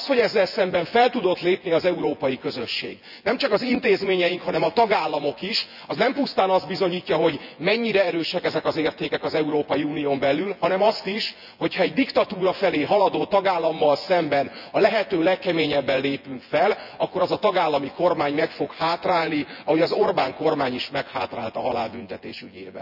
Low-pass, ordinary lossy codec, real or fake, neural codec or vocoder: 5.4 kHz; none; fake; vocoder, 24 kHz, 100 mel bands, Vocos